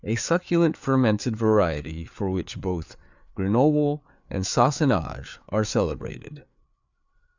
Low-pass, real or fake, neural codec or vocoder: 7.2 kHz; fake; codec, 16 kHz, 4 kbps, FreqCodec, larger model